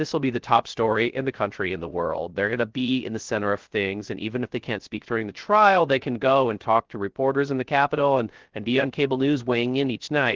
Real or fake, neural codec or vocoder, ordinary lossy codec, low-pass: fake; codec, 16 kHz, 0.3 kbps, FocalCodec; Opus, 16 kbps; 7.2 kHz